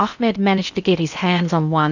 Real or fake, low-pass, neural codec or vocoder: fake; 7.2 kHz; codec, 16 kHz in and 24 kHz out, 0.6 kbps, FocalCodec, streaming, 4096 codes